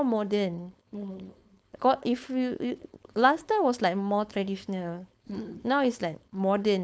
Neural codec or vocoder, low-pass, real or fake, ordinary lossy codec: codec, 16 kHz, 4.8 kbps, FACodec; none; fake; none